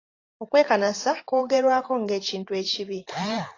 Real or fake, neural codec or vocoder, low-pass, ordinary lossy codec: fake; vocoder, 44.1 kHz, 128 mel bands every 512 samples, BigVGAN v2; 7.2 kHz; AAC, 32 kbps